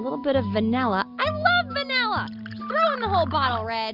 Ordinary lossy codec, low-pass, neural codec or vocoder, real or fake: Opus, 64 kbps; 5.4 kHz; none; real